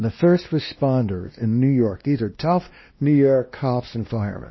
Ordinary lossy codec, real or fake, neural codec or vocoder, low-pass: MP3, 24 kbps; fake; codec, 16 kHz, 1 kbps, X-Codec, WavLM features, trained on Multilingual LibriSpeech; 7.2 kHz